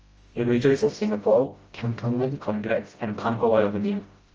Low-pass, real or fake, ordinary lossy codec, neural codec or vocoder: 7.2 kHz; fake; Opus, 24 kbps; codec, 16 kHz, 0.5 kbps, FreqCodec, smaller model